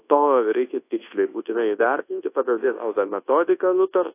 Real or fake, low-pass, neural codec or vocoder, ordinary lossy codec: fake; 3.6 kHz; codec, 24 kHz, 0.9 kbps, WavTokenizer, large speech release; AAC, 24 kbps